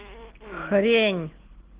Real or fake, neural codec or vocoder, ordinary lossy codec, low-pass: real; none; Opus, 16 kbps; 3.6 kHz